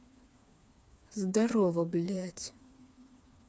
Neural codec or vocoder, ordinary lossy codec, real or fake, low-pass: codec, 16 kHz, 4 kbps, FreqCodec, smaller model; none; fake; none